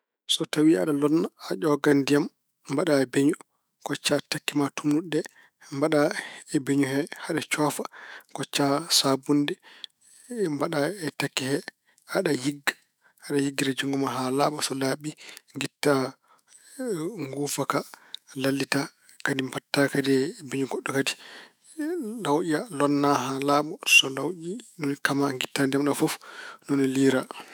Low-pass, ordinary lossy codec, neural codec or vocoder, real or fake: none; none; autoencoder, 48 kHz, 128 numbers a frame, DAC-VAE, trained on Japanese speech; fake